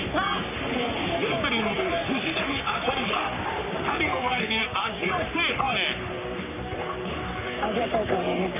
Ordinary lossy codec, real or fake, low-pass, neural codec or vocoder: none; fake; 3.6 kHz; codec, 44.1 kHz, 3.4 kbps, Pupu-Codec